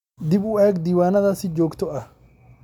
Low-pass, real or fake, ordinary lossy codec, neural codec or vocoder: 19.8 kHz; real; MP3, 96 kbps; none